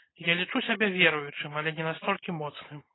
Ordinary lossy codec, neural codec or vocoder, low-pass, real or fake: AAC, 16 kbps; none; 7.2 kHz; real